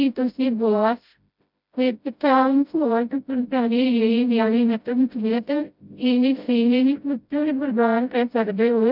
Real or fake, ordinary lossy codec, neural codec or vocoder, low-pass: fake; none; codec, 16 kHz, 0.5 kbps, FreqCodec, smaller model; 5.4 kHz